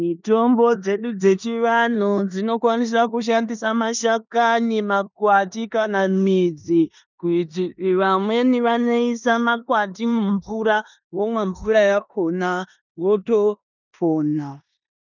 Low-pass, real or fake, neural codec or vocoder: 7.2 kHz; fake; codec, 16 kHz in and 24 kHz out, 0.9 kbps, LongCat-Audio-Codec, four codebook decoder